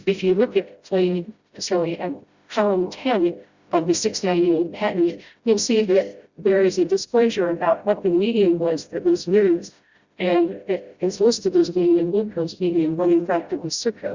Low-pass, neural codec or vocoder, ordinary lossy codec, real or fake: 7.2 kHz; codec, 16 kHz, 0.5 kbps, FreqCodec, smaller model; Opus, 64 kbps; fake